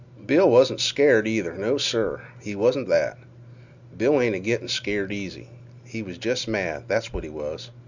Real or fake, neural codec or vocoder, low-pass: real; none; 7.2 kHz